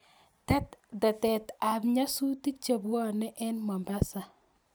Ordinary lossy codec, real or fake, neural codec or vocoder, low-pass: none; real; none; none